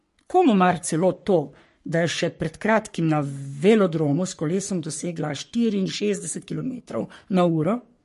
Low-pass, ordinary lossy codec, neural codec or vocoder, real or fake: 14.4 kHz; MP3, 48 kbps; codec, 44.1 kHz, 3.4 kbps, Pupu-Codec; fake